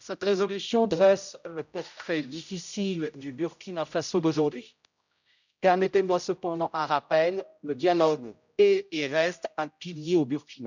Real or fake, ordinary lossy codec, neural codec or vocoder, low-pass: fake; none; codec, 16 kHz, 0.5 kbps, X-Codec, HuBERT features, trained on general audio; 7.2 kHz